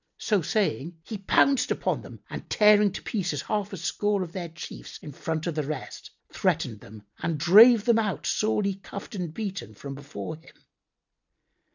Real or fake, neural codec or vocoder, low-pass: real; none; 7.2 kHz